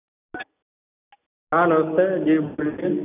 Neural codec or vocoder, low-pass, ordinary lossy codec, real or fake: none; 3.6 kHz; none; real